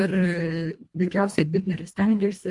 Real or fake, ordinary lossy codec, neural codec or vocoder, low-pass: fake; MP3, 64 kbps; codec, 24 kHz, 1.5 kbps, HILCodec; 10.8 kHz